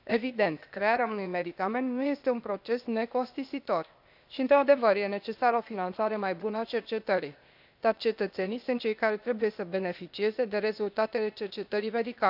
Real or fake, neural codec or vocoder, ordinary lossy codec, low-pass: fake; codec, 16 kHz, 0.8 kbps, ZipCodec; none; 5.4 kHz